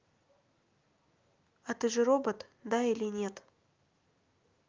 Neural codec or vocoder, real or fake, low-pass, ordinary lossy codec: none; real; 7.2 kHz; Opus, 24 kbps